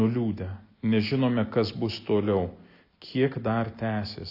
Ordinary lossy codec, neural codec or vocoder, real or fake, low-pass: AAC, 48 kbps; none; real; 5.4 kHz